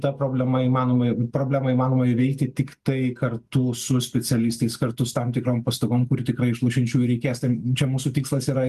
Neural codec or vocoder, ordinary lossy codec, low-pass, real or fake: none; Opus, 32 kbps; 14.4 kHz; real